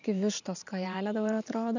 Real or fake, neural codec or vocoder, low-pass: fake; vocoder, 44.1 kHz, 128 mel bands every 512 samples, BigVGAN v2; 7.2 kHz